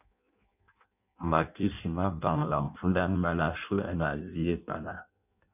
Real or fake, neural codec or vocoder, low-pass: fake; codec, 16 kHz in and 24 kHz out, 0.6 kbps, FireRedTTS-2 codec; 3.6 kHz